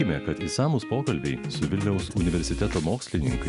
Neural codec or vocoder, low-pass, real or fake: none; 10.8 kHz; real